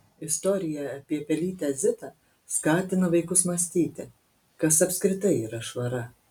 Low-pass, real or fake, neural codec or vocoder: 19.8 kHz; real; none